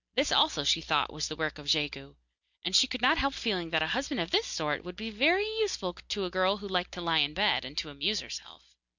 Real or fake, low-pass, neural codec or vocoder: real; 7.2 kHz; none